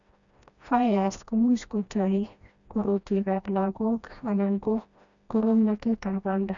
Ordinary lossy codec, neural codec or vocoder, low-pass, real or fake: none; codec, 16 kHz, 1 kbps, FreqCodec, smaller model; 7.2 kHz; fake